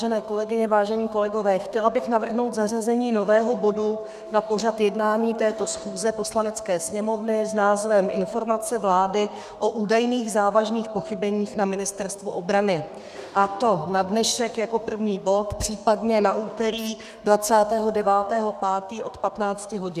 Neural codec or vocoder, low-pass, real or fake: codec, 32 kHz, 1.9 kbps, SNAC; 14.4 kHz; fake